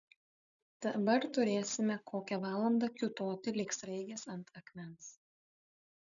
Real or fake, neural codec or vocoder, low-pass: real; none; 7.2 kHz